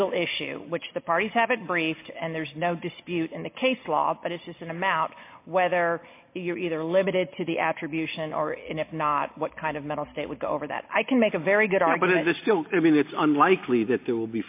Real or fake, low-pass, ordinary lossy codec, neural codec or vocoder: real; 3.6 kHz; MP3, 24 kbps; none